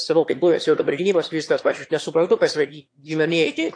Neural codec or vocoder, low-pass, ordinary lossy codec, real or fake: autoencoder, 22.05 kHz, a latent of 192 numbers a frame, VITS, trained on one speaker; 9.9 kHz; AAC, 48 kbps; fake